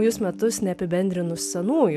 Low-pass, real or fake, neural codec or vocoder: 14.4 kHz; real; none